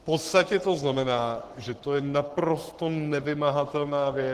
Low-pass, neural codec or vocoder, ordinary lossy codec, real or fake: 14.4 kHz; codec, 44.1 kHz, 7.8 kbps, Pupu-Codec; Opus, 16 kbps; fake